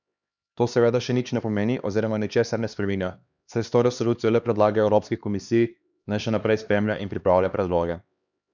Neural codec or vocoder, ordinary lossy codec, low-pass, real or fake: codec, 16 kHz, 2 kbps, X-Codec, HuBERT features, trained on LibriSpeech; none; 7.2 kHz; fake